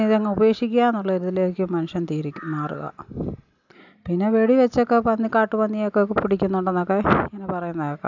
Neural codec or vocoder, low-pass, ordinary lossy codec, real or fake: none; 7.2 kHz; none; real